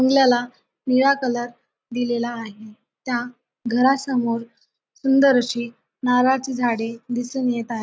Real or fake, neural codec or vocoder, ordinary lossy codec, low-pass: real; none; none; none